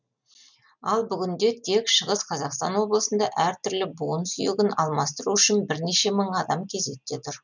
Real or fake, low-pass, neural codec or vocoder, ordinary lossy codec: real; 7.2 kHz; none; none